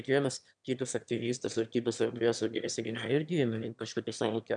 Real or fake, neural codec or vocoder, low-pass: fake; autoencoder, 22.05 kHz, a latent of 192 numbers a frame, VITS, trained on one speaker; 9.9 kHz